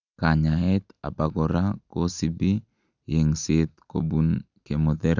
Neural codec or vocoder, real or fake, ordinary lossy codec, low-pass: none; real; none; 7.2 kHz